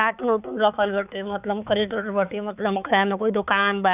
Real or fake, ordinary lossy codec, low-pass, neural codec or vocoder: fake; none; 3.6 kHz; codec, 16 kHz, 4 kbps, X-Codec, HuBERT features, trained on balanced general audio